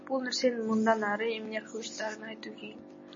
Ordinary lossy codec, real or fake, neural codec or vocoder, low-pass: MP3, 32 kbps; real; none; 7.2 kHz